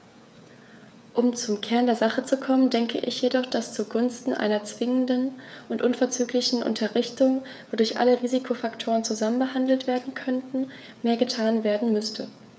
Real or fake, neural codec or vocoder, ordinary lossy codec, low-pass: fake; codec, 16 kHz, 16 kbps, FreqCodec, smaller model; none; none